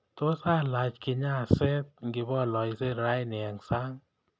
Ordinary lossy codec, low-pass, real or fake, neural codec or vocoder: none; none; real; none